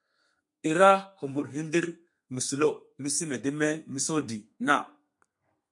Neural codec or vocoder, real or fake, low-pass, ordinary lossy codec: codec, 32 kHz, 1.9 kbps, SNAC; fake; 10.8 kHz; MP3, 64 kbps